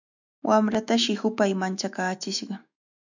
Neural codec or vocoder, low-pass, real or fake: autoencoder, 48 kHz, 128 numbers a frame, DAC-VAE, trained on Japanese speech; 7.2 kHz; fake